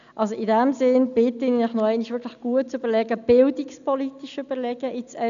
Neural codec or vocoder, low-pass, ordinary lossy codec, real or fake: none; 7.2 kHz; none; real